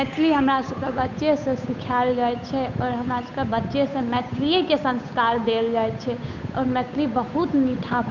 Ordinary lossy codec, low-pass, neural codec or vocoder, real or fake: none; 7.2 kHz; codec, 16 kHz, 8 kbps, FunCodec, trained on Chinese and English, 25 frames a second; fake